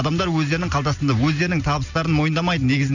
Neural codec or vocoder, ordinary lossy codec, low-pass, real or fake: none; none; 7.2 kHz; real